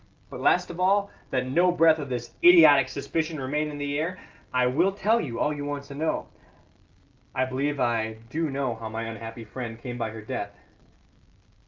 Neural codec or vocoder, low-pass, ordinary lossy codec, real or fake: none; 7.2 kHz; Opus, 32 kbps; real